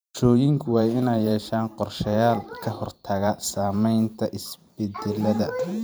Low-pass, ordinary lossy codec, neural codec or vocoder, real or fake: none; none; vocoder, 44.1 kHz, 128 mel bands every 512 samples, BigVGAN v2; fake